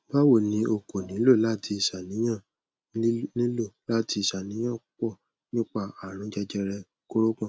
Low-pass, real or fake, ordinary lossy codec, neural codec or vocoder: none; real; none; none